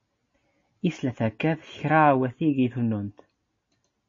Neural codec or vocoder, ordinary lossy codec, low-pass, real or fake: none; MP3, 32 kbps; 7.2 kHz; real